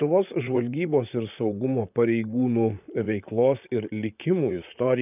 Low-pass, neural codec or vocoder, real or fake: 3.6 kHz; vocoder, 44.1 kHz, 128 mel bands, Pupu-Vocoder; fake